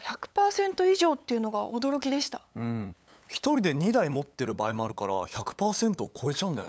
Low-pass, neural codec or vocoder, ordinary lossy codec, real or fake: none; codec, 16 kHz, 8 kbps, FunCodec, trained on LibriTTS, 25 frames a second; none; fake